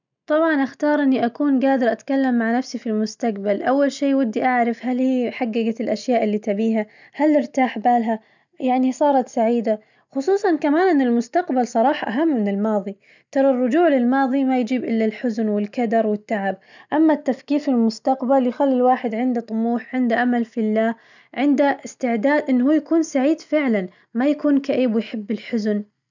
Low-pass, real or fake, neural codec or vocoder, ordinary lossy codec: 7.2 kHz; real; none; none